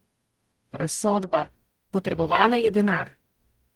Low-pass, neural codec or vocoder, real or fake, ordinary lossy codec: 19.8 kHz; codec, 44.1 kHz, 0.9 kbps, DAC; fake; Opus, 24 kbps